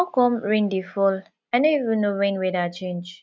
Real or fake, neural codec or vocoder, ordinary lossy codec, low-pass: real; none; none; none